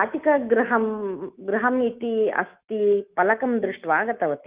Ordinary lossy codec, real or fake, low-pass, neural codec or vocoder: Opus, 32 kbps; fake; 3.6 kHz; autoencoder, 48 kHz, 128 numbers a frame, DAC-VAE, trained on Japanese speech